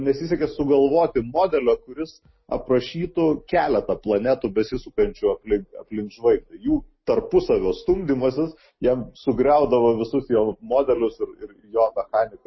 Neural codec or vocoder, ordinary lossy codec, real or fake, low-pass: none; MP3, 24 kbps; real; 7.2 kHz